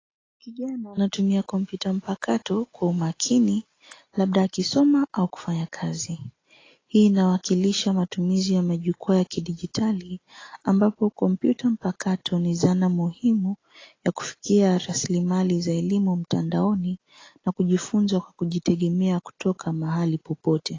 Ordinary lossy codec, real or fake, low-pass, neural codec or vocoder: AAC, 32 kbps; real; 7.2 kHz; none